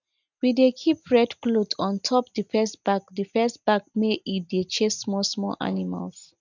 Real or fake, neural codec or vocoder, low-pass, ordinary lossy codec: real; none; 7.2 kHz; none